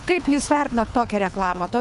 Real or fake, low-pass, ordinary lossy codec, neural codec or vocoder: fake; 10.8 kHz; MP3, 96 kbps; codec, 24 kHz, 3 kbps, HILCodec